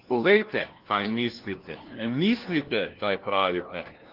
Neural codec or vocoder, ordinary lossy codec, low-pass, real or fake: codec, 16 kHz, 1 kbps, FunCodec, trained on LibriTTS, 50 frames a second; Opus, 16 kbps; 5.4 kHz; fake